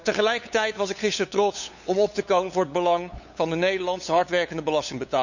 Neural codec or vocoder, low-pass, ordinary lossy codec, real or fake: codec, 16 kHz, 8 kbps, FunCodec, trained on LibriTTS, 25 frames a second; 7.2 kHz; none; fake